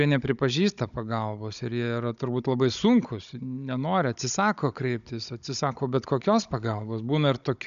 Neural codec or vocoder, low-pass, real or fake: codec, 16 kHz, 16 kbps, FunCodec, trained on Chinese and English, 50 frames a second; 7.2 kHz; fake